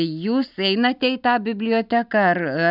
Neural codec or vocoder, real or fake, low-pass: none; real; 5.4 kHz